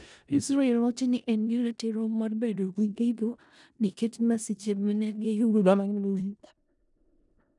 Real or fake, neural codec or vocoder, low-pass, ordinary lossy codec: fake; codec, 16 kHz in and 24 kHz out, 0.4 kbps, LongCat-Audio-Codec, four codebook decoder; 10.8 kHz; none